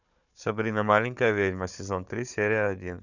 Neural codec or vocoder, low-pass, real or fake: codec, 16 kHz, 4 kbps, FunCodec, trained on Chinese and English, 50 frames a second; 7.2 kHz; fake